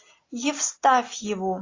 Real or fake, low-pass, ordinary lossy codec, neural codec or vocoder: real; 7.2 kHz; AAC, 32 kbps; none